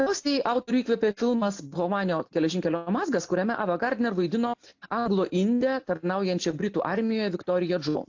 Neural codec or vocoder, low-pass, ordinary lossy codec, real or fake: none; 7.2 kHz; AAC, 48 kbps; real